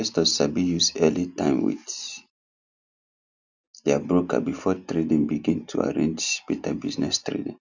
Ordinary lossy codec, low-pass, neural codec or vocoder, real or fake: none; 7.2 kHz; none; real